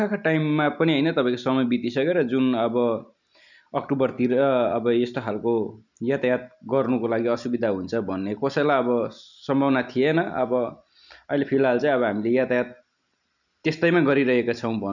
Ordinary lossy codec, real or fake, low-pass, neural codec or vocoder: none; real; 7.2 kHz; none